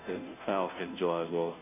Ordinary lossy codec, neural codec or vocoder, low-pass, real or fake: none; codec, 16 kHz, 0.5 kbps, FunCodec, trained on Chinese and English, 25 frames a second; 3.6 kHz; fake